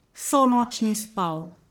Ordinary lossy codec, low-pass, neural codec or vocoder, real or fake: none; none; codec, 44.1 kHz, 1.7 kbps, Pupu-Codec; fake